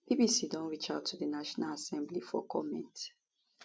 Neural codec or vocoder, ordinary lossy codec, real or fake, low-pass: none; none; real; none